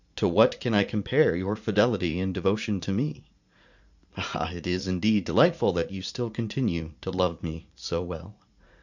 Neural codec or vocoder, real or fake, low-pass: vocoder, 44.1 kHz, 80 mel bands, Vocos; fake; 7.2 kHz